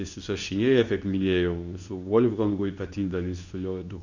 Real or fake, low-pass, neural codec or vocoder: fake; 7.2 kHz; codec, 24 kHz, 0.9 kbps, WavTokenizer, medium speech release version 2